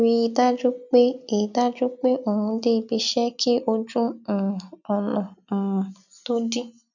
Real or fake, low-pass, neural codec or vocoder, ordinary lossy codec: real; 7.2 kHz; none; none